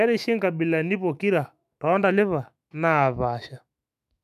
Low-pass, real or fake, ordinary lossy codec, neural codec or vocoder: 14.4 kHz; fake; none; autoencoder, 48 kHz, 128 numbers a frame, DAC-VAE, trained on Japanese speech